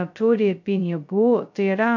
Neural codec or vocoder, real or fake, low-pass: codec, 16 kHz, 0.2 kbps, FocalCodec; fake; 7.2 kHz